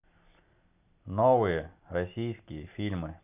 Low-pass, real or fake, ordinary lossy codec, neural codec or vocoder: 3.6 kHz; real; none; none